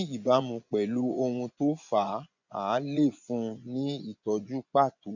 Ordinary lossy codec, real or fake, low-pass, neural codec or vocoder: none; fake; 7.2 kHz; vocoder, 24 kHz, 100 mel bands, Vocos